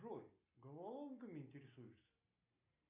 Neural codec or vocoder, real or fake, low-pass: none; real; 3.6 kHz